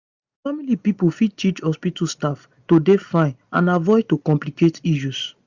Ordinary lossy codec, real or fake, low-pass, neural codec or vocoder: Opus, 64 kbps; real; 7.2 kHz; none